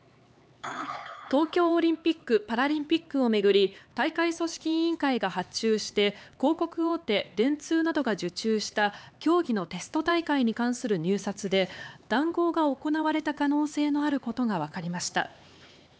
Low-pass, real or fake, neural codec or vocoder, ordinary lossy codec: none; fake; codec, 16 kHz, 4 kbps, X-Codec, HuBERT features, trained on LibriSpeech; none